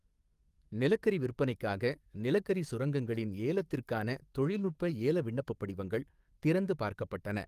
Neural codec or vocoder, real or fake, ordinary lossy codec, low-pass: codec, 44.1 kHz, 7.8 kbps, DAC; fake; Opus, 32 kbps; 14.4 kHz